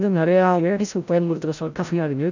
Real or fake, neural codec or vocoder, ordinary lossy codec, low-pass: fake; codec, 16 kHz, 0.5 kbps, FreqCodec, larger model; none; 7.2 kHz